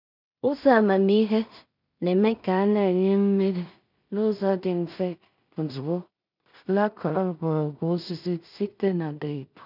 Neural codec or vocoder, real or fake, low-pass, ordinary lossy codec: codec, 16 kHz in and 24 kHz out, 0.4 kbps, LongCat-Audio-Codec, two codebook decoder; fake; 5.4 kHz; none